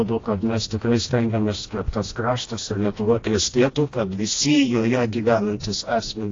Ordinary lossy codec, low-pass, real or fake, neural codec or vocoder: AAC, 32 kbps; 7.2 kHz; fake; codec, 16 kHz, 1 kbps, FreqCodec, smaller model